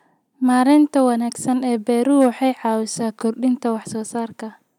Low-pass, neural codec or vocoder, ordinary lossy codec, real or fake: 19.8 kHz; none; none; real